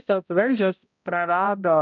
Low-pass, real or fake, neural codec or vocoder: 7.2 kHz; fake; codec, 16 kHz, 0.5 kbps, X-Codec, HuBERT features, trained on balanced general audio